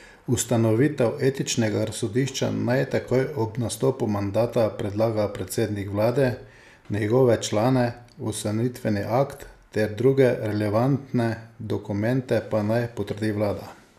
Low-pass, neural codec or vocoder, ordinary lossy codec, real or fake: 14.4 kHz; none; none; real